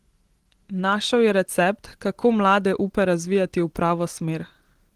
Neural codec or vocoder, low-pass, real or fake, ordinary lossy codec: none; 14.4 kHz; real; Opus, 16 kbps